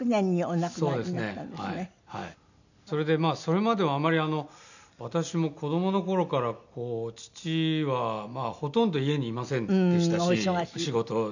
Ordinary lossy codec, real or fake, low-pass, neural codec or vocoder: none; real; 7.2 kHz; none